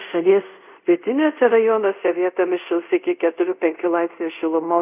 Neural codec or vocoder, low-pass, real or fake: codec, 24 kHz, 0.5 kbps, DualCodec; 3.6 kHz; fake